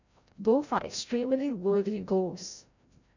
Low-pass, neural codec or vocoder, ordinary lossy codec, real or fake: 7.2 kHz; codec, 16 kHz, 0.5 kbps, FreqCodec, larger model; none; fake